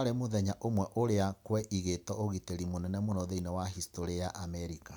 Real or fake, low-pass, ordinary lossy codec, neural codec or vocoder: real; none; none; none